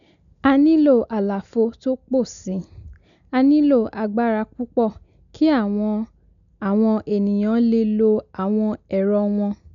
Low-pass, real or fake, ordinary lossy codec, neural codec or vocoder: 7.2 kHz; real; none; none